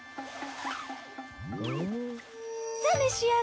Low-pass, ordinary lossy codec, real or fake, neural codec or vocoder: none; none; real; none